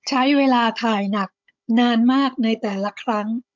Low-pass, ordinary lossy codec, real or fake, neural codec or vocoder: 7.2 kHz; MP3, 64 kbps; fake; codec, 16 kHz, 16 kbps, FunCodec, trained on Chinese and English, 50 frames a second